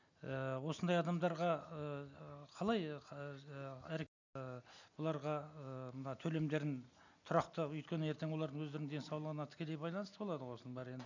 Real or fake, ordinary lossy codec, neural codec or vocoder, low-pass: real; none; none; 7.2 kHz